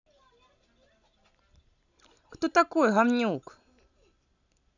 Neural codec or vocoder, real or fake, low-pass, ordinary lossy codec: none; real; 7.2 kHz; none